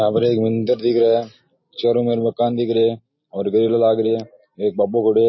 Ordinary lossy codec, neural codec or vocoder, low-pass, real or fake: MP3, 24 kbps; none; 7.2 kHz; real